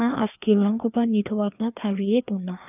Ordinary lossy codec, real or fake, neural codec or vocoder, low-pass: none; fake; codec, 16 kHz, 2 kbps, FreqCodec, larger model; 3.6 kHz